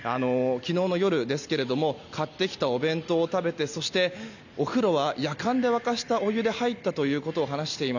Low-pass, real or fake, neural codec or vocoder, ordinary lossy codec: 7.2 kHz; real; none; none